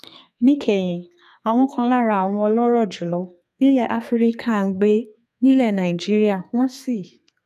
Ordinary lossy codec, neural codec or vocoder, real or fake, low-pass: none; codec, 32 kHz, 1.9 kbps, SNAC; fake; 14.4 kHz